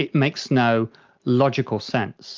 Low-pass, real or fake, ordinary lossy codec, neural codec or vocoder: 7.2 kHz; real; Opus, 24 kbps; none